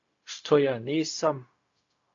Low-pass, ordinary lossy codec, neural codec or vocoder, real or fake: 7.2 kHz; AAC, 48 kbps; codec, 16 kHz, 0.4 kbps, LongCat-Audio-Codec; fake